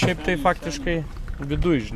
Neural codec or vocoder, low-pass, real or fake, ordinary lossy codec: none; 14.4 kHz; real; MP3, 96 kbps